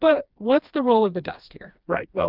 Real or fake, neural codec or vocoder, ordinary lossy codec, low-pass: fake; codec, 16 kHz, 2 kbps, FreqCodec, smaller model; Opus, 24 kbps; 5.4 kHz